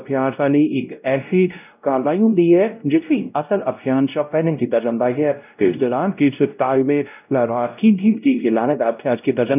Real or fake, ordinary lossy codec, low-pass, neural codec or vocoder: fake; none; 3.6 kHz; codec, 16 kHz, 0.5 kbps, X-Codec, WavLM features, trained on Multilingual LibriSpeech